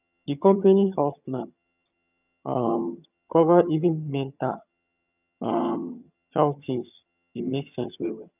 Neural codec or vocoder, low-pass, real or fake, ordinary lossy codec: vocoder, 22.05 kHz, 80 mel bands, HiFi-GAN; 3.6 kHz; fake; none